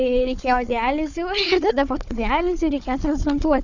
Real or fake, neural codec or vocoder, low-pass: fake; codec, 16 kHz, 4 kbps, FunCodec, trained on Chinese and English, 50 frames a second; 7.2 kHz